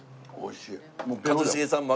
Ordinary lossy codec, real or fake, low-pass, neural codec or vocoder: none; real; none; none